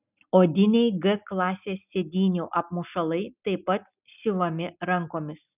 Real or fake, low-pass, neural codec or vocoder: real; 3.6 kHz; none